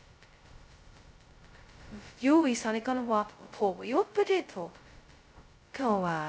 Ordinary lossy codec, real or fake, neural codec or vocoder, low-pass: none; fake; codec, 16 kHz, 0.2 kbps, FocalCodec; none